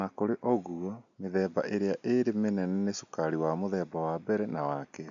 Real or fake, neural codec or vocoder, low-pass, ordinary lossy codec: real; none; 7.2 kHz; none